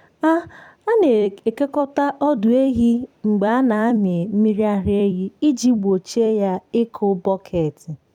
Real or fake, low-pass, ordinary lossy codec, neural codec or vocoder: fake; 19.8 kHz; none; vocoder, 44.1 kHz, 128 mel bands every 256 samples, BigVGAN v2